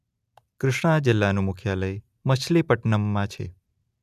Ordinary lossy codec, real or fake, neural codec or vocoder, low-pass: none; real; none; 14.4 kHz